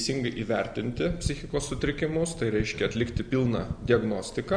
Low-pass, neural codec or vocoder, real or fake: 9.9 kHz; none; real